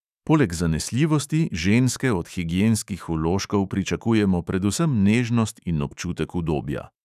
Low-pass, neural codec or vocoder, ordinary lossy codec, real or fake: 14.4 kHz; autoencoder, 48 kHz, 128 numbers a frame, DAC-VAE, trained on Japanese speech; none; fake